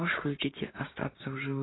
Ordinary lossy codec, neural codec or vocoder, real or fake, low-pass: AAC, 16 kbps; codec, 16 kHz, 6 kbps, DAC; fake; 7.2 kHz